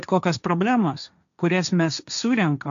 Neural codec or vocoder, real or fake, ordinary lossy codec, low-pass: codec, 16 kHz, 1.1 kbps, Voila-Tokenizer; fake; AAC, 96 kbps; 7.2 kHz